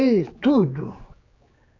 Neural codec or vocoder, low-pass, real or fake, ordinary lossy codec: codec, 16 kHz, 4 kbps, X-Codec, HuBERT features, trained on general audio; 7.2 kHz; fake; AAC, 32 kbps